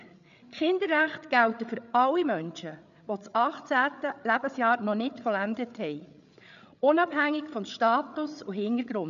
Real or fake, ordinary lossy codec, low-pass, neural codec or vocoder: fake; none; 7.2 kHz; codec, 16 kHz, 8 kbps, FreqCodec, larger model